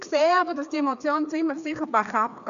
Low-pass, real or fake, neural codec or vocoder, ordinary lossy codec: 7.2 kHz; fake; codec, 16 kHz, 2 kbps, FreqCodec, larger model; none